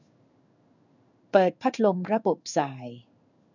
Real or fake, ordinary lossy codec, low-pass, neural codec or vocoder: fake; none; 7.2 kHz; codec, 16 kHz in and 24 kHz out, 1 kbps, XY-Tokenizer